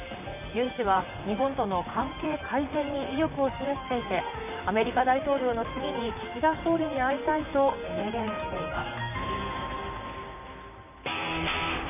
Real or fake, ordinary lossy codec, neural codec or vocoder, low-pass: fake; none; vocoder, 44.1 kHz, 128 mel bands, Pupu-Vocoder; 3.6 kHz